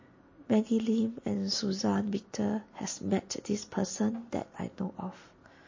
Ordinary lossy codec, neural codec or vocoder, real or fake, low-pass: MP3, 32 kbps; none; real; 7.2 kHz